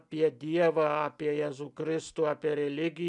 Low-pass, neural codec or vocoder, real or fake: 10.8 kHz; none; real